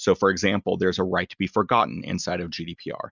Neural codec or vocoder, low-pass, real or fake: none; 7.2 kHz; real